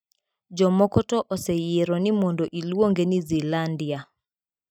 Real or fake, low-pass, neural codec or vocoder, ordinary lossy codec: real; 19.8 kHz; none; none